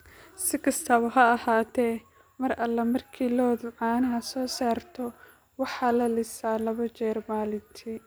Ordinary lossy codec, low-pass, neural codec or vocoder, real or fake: none; none; none; real